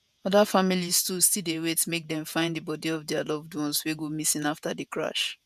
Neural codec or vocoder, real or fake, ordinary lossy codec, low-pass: vocoder, 48 kHz, 128 mel bands, Vocos; fake; none; 14.4 kHz